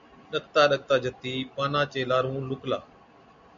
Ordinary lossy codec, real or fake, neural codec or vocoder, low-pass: MP3, 96 kbps; real; none; 7.2 kHz